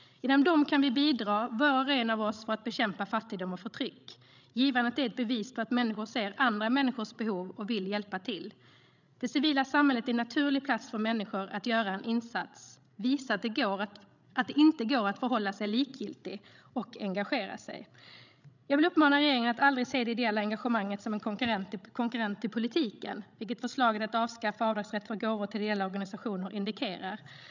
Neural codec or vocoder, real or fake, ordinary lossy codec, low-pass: codec, 16 kHz, 16 kbps, FreqCodec, larger model; fake; none; 7.2 kHz